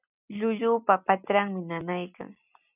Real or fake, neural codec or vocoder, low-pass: real; none; 3.6 kHz